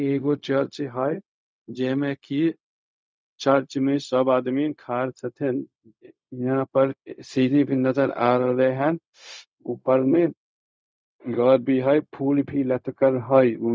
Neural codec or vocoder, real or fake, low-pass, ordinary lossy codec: codec, 16 kHz, 0.4 kbps, LongCat-Audio-Codec; fake; none; none